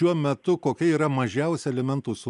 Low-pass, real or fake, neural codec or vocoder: 10.8 kHz; real; none